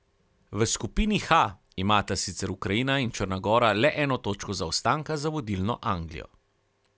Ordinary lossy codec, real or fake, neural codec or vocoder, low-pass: none; real; none; none